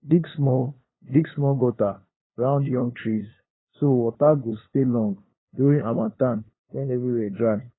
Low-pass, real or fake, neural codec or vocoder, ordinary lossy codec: 7.2 kHz; fake; codec, 16 kHz, 2 kbps, FunCodec, trained on LibriTTS, 25 frames a second; AAC, 16 kbps